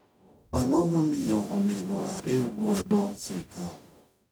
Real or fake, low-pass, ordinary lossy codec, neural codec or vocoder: fake; none; none; codec, 44.1 kHz, 0.9 kbps, DAC